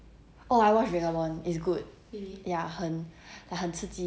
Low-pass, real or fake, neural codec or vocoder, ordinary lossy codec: none; real; none; none